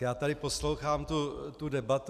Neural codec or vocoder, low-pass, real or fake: none; 14.4 kHz; real